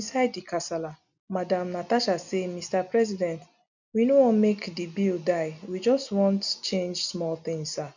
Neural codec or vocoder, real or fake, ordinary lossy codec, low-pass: none; real; none; 7.2 kHz